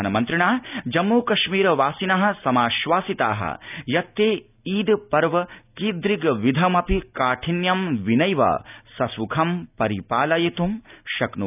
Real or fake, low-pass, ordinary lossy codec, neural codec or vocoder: real; 3.6 kHz; none; none